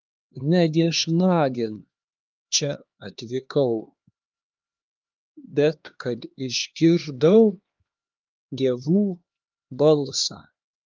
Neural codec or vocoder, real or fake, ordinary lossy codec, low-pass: codec, 16 kHz, 2 kbps, X-Codec, HuBERT features, trained on LibriSpeech; fake; Opus, 32 kbps; 7.2 kHz